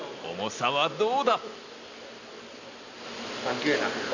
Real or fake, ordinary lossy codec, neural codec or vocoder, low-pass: real; none; none; 7.2 kHz